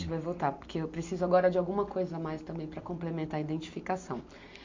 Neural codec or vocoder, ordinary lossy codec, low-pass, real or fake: none; MP3, 48 kbps; 7.2 kHz; real